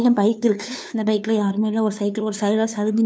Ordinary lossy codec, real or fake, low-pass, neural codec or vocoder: none; fake; none; codec, 16 kHz, 2 kbps, FunCodec, trained on LibriTTS, 25 frames a second